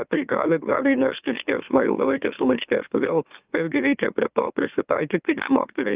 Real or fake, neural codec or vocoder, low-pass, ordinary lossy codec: fake; autoencoder, 44.1 kHz, a latent of 192 numbers a frame, MeloTTS; 3.6 kHz; Opus, 24 kbps